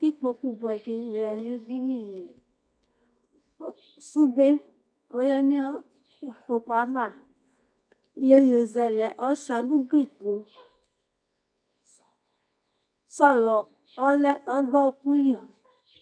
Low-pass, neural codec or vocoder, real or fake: 9.9 kHz; codec, 24 kHz, 0.9 kbps, WavTokenizer, medium music audio release; fake